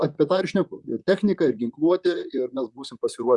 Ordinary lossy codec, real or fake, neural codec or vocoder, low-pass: Opus, 64 kbps; fake; vocoder, 44.1 kHz, 128 mel bands, Pupu-Vocoder; 10.8 kHz